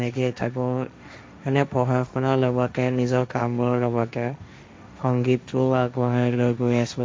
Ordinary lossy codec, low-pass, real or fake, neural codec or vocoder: none; none; fake; codec, 16 kHz, 1.1 kbps, Voila-Tokenizer